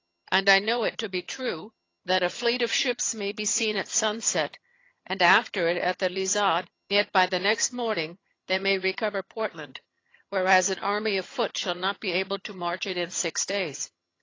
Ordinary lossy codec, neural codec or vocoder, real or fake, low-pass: AAC, 32 kbps; vocoder, 22.05 kHz, 80 mel bands, HiFi-GAN; fake; 7.2 kHz